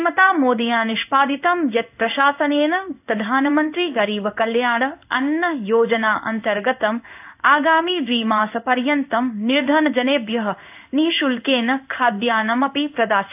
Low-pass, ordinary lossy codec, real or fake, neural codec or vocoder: 3.6 kHz; AAC, 32 kbps; fake; codec, 16 kHz in and 24 kHz out, 1 kbps, XY-Tokenizer